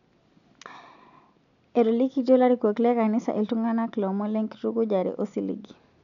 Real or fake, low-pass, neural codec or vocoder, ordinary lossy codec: real; 7.2 kHz; none; none